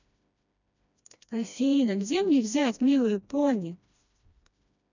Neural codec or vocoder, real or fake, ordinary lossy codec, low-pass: codec, 16 kHz, 1 kbps, FreqCodec, smaller model; fake; none; 7.2 kHz